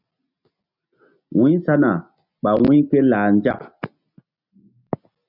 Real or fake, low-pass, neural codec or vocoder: real; 5.4 kHz; none